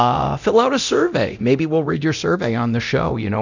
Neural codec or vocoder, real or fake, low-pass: codec, 24 kHz, 0.9 kbps, DualCodec; fake; 7.2 kHz